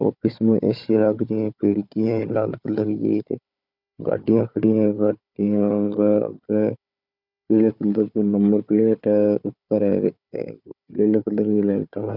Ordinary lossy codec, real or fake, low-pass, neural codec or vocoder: none; fake; 5.4 kHz; codec, 16 kHz, 4 kbps, FunCodec, trained on Chinese and English, 50 frames a second